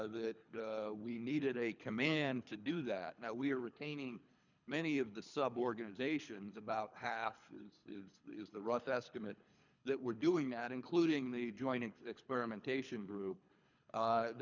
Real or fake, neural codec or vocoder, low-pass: fake; codec, 24 kHz, 3 kbps, HILCodec; 7.2 kHz